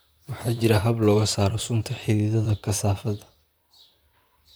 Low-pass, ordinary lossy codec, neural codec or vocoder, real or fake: none; none; vocoder, 44.1 kHz, 128 mel bands, Pupu-Vocoder; fake